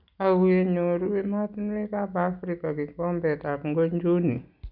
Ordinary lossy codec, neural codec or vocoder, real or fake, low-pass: none; none; real; 5.4 kHz